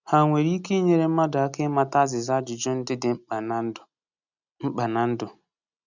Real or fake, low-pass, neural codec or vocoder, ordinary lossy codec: real; 7.2 kHz; none; none